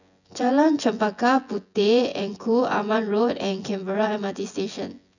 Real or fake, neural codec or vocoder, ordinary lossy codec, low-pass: fake; vocoder, 24 kHz, 100 mel bands, Vocos; none; 7.2 kHz